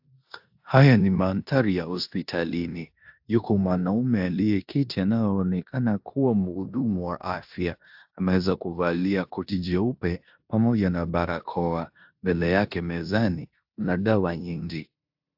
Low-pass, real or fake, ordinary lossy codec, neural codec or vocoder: 5.4 kHz; fake; AAC, 48 kbps; codec, 16 kHz in and 24 kHz out, 0.9 kbps, LongCat-Audio-Codec, four codebook decoder